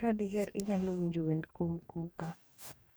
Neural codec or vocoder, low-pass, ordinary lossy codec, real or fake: codec, 44.1 kHz, 2.6 kbps, DAC; none; none; fake